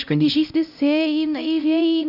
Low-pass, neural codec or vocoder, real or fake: 5.4 kHz; codec, 16 kHz, 0.5 kbps, X-Codec, HuBERT features, trained on LibriSpeech; fake